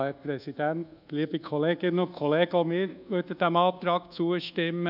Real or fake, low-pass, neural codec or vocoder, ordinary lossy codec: fake; 5.4 kHz; codec, 24 kHz, 1.2 kbps, DualCodec; none